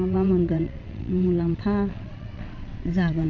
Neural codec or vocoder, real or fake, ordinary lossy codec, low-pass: vocoder, 22.05 kHz, 80 mel bands, WaveNeXt; fake; none; 7.2 kHz